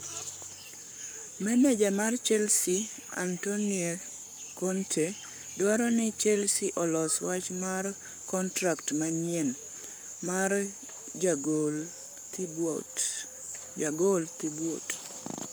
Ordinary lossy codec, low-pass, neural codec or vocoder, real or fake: none; none; codec, 44.1 kHz, 7.8 kbps, Pupu-Codec; fake